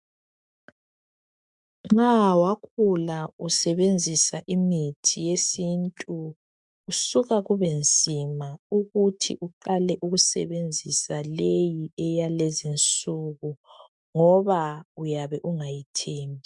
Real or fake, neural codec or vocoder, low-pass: fake; autoencoder, 48 kHz, 128 numbers a frame, DAC-VAE, trained on Japanese speech; 10.8 kHz